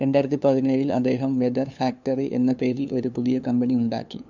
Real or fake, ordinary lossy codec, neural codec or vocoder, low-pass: fake; none; codec, 16 kHz, 2 kbps, FunCodec, trained on LibriTTS, 25 frames a second; 7.2 kHz